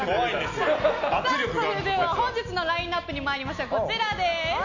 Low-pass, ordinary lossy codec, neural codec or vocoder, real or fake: 7.2 kHz; none; none; real